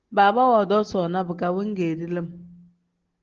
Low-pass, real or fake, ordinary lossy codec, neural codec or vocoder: 7.2 kHz; real; Opus, 16 kbps; none